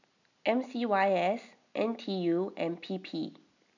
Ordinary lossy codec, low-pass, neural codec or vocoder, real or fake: none; 7.2 kHz; none; real